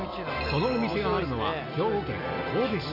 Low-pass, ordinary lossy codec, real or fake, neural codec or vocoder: 5.4 kHz; Opus, 64 kbps; real; none